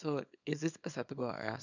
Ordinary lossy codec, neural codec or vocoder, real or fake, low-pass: none; codec, 24 kHz, 0.9 kbps, WavTokenizer, small release; fake; 7.2 kHz